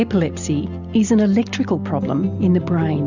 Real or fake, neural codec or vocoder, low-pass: real; none; 7.2 kHz